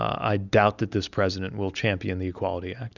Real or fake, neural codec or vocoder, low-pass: real; none; 7.2 kHz